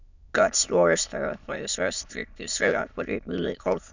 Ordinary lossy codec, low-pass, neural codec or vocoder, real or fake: none; 7.2 kHz; autoencoder, 22.05 kHz, a latent of 192 numbers a frame, VITS, trained on many speakers; fake